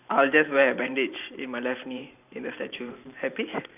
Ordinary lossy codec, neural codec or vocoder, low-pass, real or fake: none; vocoder, 44.1 kHz, 128 mel bands every 512 samples, BigVGAN v2; 3.6 kHz; fake